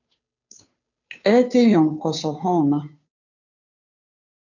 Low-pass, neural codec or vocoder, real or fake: 7.2 kHz; codec, 16 kHz, 2 kbps, FunCodec, trained on Chinese and English, 25 frames a second; fake